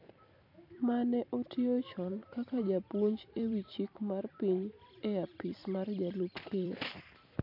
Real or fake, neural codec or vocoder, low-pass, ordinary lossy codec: fake; vocoder, 44.1 kHz, 128 mel bands every 256 samples, BigVGAN v2; 5.4 kHz; MP3, 48 kbps